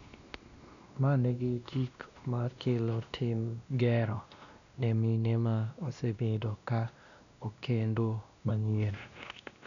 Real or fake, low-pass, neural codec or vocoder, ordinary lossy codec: fake; 7.2 kHz; codec, 16 kHz, 1 kbps, X-Codec, WavLM features, trained on Multilingual LibriSpeech; none